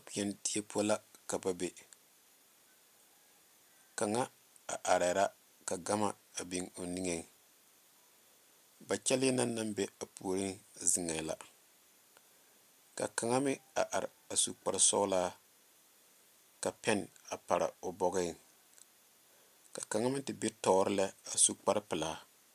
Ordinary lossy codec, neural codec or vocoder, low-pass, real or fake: MP3, 96 kbps; none; 14.4 kHz; real